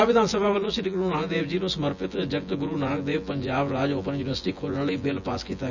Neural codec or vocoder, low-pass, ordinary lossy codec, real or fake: vocoder, 24 kHz, 100 mel bands, Vocos; 7.2 kHz; none; fake